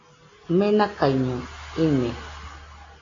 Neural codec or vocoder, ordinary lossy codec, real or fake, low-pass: none; AAC, 32 kbps; real; 7.2 kHz